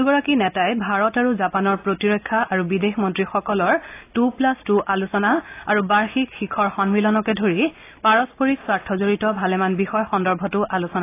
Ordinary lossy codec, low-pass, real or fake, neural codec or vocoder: AAC, 24 kbps; 3.6 kHz; real; none